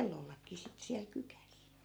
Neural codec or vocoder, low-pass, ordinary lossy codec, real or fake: none; none; none; real